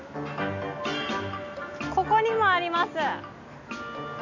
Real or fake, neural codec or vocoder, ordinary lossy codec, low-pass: real; none; none; 7.2 kHz